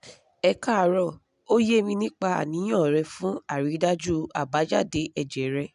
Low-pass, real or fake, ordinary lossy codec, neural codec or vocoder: 10.8 kHz; real; none; none